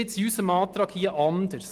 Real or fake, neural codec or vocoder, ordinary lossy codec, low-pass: real; none; Opus, 24 kbps; 14.4 kHz